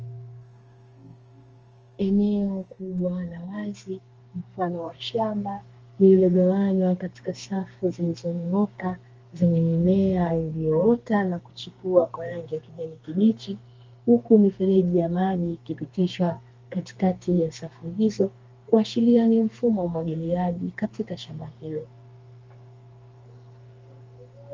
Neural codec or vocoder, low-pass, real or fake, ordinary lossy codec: codec, 32 kHz, 1.9 kbps, SNAC; 7.2 kHz; fake; Opus, 24 kbps